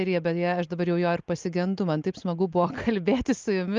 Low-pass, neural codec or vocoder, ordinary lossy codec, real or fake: 7.2 kHz; none; Opus, 32 kbps; real